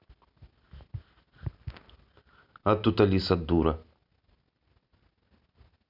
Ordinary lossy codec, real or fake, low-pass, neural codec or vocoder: none; real; 5.4 kHz; none